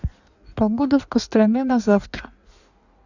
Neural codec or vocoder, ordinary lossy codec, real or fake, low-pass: codec, 16 kHz in and 24 kHz out, 1.1 kbps, FireRedTTS-2 codec; none; fake; 7.2 kHz